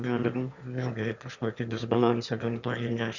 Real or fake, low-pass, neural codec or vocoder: fake; 7.2 kHz; autoencoder, 22.05 kHz, a latent of 192 numbers a frame, VITS, trained on one speaker